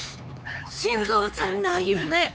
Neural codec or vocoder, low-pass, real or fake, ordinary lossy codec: codec, 16 kHz, 4 kbps, X-Codec, HuBERT features, trained on LibriSpeech; none; fake; none